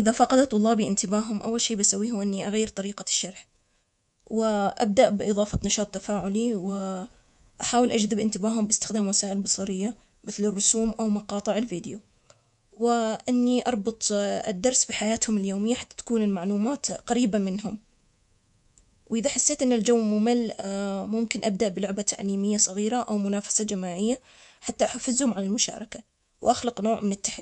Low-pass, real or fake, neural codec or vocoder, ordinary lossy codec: 10.8 kHz; fake; codec, 24 kHz, 3.1 kbps, DualCodec; none